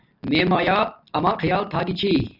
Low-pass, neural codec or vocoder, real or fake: 5.4 kHz; none; real